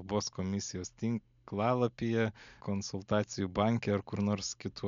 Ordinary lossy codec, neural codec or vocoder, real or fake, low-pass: MP3, 64 kbps; none; real; 7.2 kHz